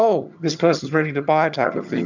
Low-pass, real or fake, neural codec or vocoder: 7.2 kHz; fake; vocoder, 22.05 kHz, 80 mel bands, HiFi-GAN